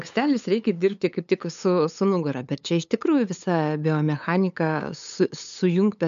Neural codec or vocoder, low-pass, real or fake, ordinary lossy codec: codec, 16 kHz, 8 kbps, FunCodec, trained on LibriTTS, 25 frames a second; 7.2 kHz; fake; MP3, 64 kbps